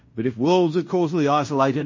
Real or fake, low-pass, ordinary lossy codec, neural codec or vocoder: fake; 7.2 kHz; MP3, 32 kbps; codec, 16 kHz in and 24 kHz out, 0.9 kbps, LongCat-Audio-Codec, fine tuned four codebook decoder